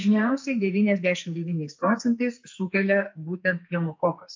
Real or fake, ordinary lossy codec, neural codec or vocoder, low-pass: fake; MP3, 48 kbps; codec, 44.1 kHz, 2.6 kbps, SNAC; 7.2 kHz